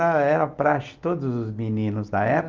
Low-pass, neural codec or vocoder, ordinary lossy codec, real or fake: 7.2 kHz; none; Opus, 24 kbps; real